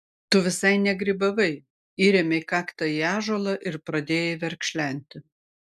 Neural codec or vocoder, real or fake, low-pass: none; real; 14.4 kHz